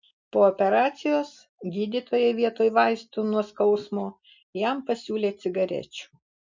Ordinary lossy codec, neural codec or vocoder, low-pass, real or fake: MP3, 64 kbps; none; 7.2 kHz; real